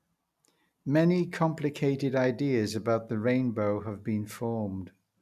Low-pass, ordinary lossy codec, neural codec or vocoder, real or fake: 14.4 kHz; none; none; real